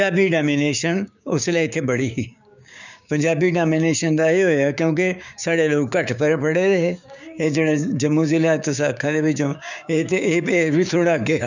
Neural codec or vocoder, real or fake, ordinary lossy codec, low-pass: codec, 16 kHz, 6 kbps, DAC; fake; none; 7.2 kHz